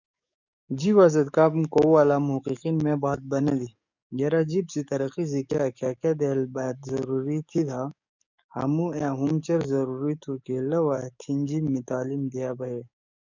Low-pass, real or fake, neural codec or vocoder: 7.2 kHz; fake; codec, 44.1 kHz, 7.8 kbps, DAC